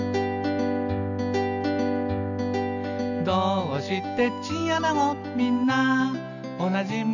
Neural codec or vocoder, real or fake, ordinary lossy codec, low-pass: none; real; none; 7.2 kHz